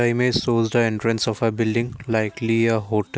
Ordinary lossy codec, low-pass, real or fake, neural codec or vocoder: none; none; real; none